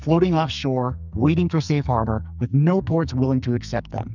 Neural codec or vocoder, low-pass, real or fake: codec, 44.1 kHz, 2.6 kbps, SNAC; 7.2 kHz; fake